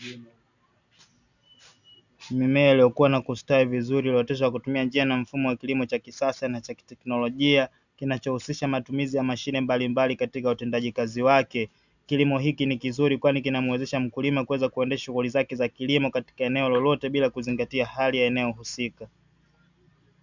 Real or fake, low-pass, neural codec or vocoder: real; 7.2 kHz; none